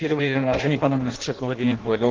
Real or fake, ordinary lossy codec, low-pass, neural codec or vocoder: fake; Opus, 16 kbps; 7.2 kHz; codec, 16 kHz in and 24 kHz out, 0.6 kbps, FireRedTTS-2 codec